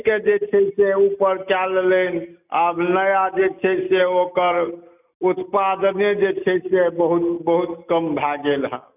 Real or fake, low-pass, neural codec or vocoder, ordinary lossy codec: real; 3.6 kHz; none; none